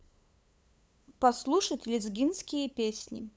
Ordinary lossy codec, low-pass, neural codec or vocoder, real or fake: none; none; codec, 16 kHz, 8 kbps, FunCodec, trained on LibriTTS, 25 frames a second; fake